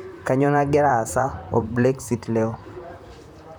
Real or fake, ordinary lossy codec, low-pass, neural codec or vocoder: fake; none; none; vocoder, 44.1 kHz, 128 mel bands, Pupu-Vocoder